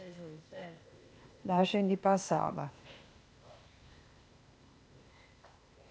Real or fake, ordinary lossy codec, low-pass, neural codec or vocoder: fake; none; none; codec, 16 kHz, 0.8 kbps, ZipCodec